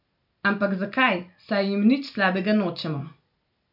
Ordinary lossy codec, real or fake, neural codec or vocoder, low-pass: none; real; none; 5.4 kHz